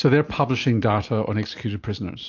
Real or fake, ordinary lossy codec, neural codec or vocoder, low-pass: real; AAC, 48 kbps; none; 7.2 kHz